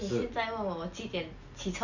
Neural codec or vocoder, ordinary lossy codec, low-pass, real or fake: none; none; 7.2 kHz; real